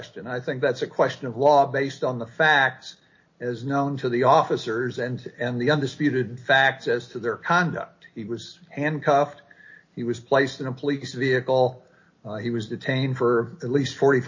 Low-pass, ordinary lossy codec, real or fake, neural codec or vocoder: 7.2 kHz; MP3, 32 kbps; real; none